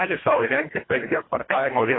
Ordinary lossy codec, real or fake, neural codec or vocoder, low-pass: AAC, 16 kbps; fake; codec, 24 kHz, 1.5 kbps, HILCodec; 7.2 kHz